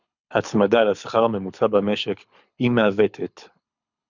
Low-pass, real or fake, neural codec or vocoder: 7.2 kHz; fake; codec, 24 kHz, 6 kbps, HILCodec